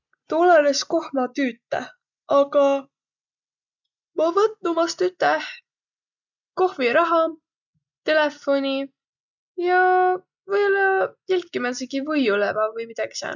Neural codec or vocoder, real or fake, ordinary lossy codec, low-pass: none; real; none; 7.2 kHz